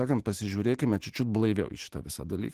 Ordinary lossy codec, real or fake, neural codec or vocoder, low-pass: Opus, 16 kbps; fake; autoencoder, 48 kHz, 128 numbers a frame, DAC-VAE, trained on Japanese speech; 14.4 kHz